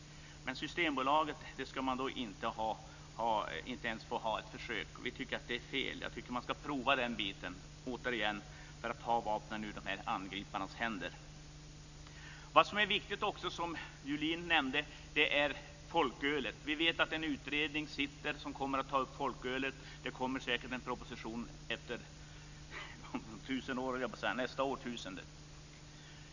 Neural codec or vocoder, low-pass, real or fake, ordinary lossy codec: none; 7.2 kHz; real; none